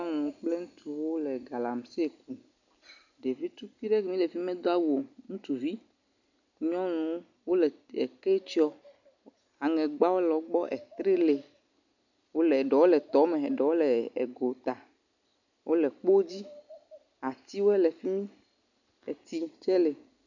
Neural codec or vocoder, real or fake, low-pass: none; real; 7.2 kHz